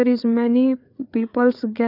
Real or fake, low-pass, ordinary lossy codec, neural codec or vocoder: fake; 5.4 kHz; none; codec, 16 kHz, 4 kbps, FreqCodec, larger model